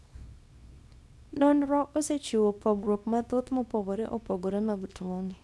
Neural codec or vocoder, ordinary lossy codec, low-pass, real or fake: codec, 24 kHz, 0.9 kbps, WavTokenizer, small release; none; none; fake